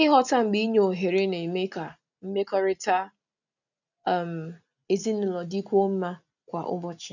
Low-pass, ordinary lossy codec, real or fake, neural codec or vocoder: 7.2 kHz; none; real; none